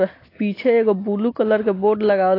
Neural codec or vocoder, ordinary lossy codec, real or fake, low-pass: none; AAC, 24 kbps; real; 5.4 kHz